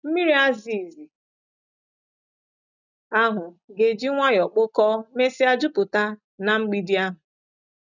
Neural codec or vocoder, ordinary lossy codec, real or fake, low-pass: none; none; real; 7.2 kHz